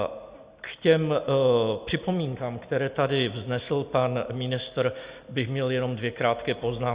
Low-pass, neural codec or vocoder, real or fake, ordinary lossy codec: 3.6 kHz; none; real; Opus, 64 kbps